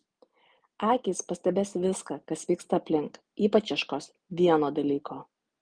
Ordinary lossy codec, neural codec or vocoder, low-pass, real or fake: Opus, 32 kbps; none; 9.9 kHz; real